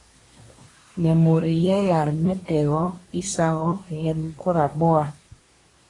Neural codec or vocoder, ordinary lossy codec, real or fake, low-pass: codec, 24 kHz, 1 kbps, SNAC; AAC, 32 kbps; fake; 10.8 kHz